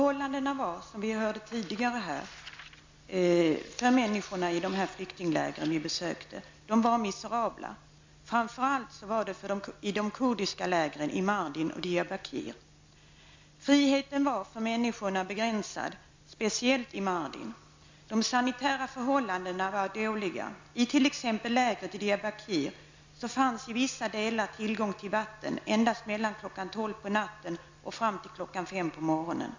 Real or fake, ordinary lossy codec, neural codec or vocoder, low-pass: real; MP3, 64 kbps; none; 7.2 kHz